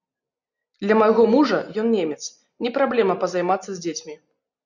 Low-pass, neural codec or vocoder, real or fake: 7.2 kHz; none; real